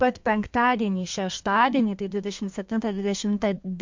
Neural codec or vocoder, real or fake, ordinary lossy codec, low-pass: codec, 32 kHz, 1.9 kbps, SNAC; fake; MP3, 48 kbps; 7.2 kHz